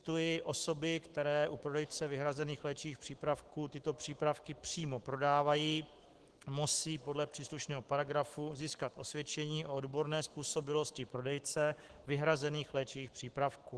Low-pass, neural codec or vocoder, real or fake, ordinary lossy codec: 10.8 kHz; autoencoder, 48 kHz, 128 numbers a frame, DAC-VAE, trained on Japanese speech; fake; Opus, 16 kbps